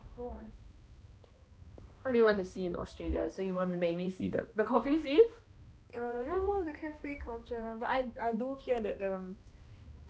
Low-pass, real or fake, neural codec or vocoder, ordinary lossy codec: none; fake; codec, 16 kHz, 1 kbps, X-Codec, HuBERT features, trained on balanced general audio; none